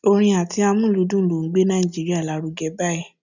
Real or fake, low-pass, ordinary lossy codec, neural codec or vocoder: real; 7.2 kHz; none; none